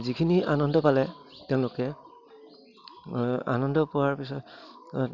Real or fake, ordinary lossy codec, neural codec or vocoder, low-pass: real; none; none; 7.2 kHz